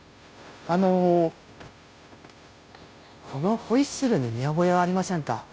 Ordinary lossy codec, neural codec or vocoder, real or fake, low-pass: none; codec, 16 kHz, 0.5 kbps, FunCodec, trained on Chinese and English, 25 frames a second; fake; none